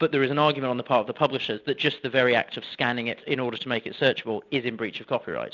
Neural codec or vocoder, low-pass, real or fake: none; 7.2 kHz; real